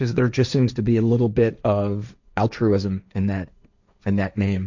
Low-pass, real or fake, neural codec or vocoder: 7.2 kHz; fake; codec, 16 kHz, 1.1 kbps, Voila-Tokenizer